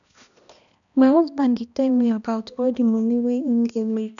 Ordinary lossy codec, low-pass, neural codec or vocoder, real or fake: none; 7.2 kHz; codec, 16 kHz, 1 kbps, X-Codec, HuBERT features, trained on balanced general audio; fake